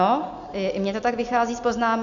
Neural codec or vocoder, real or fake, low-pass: none; real; 7.2 kHz